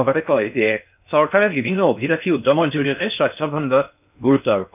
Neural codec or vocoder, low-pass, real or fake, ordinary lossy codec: codec, 16 kHz in and 24 kHz out, 0.6 kbps, FocalCodec, streaming, 4096 codes; 3.6 kHz; fake; none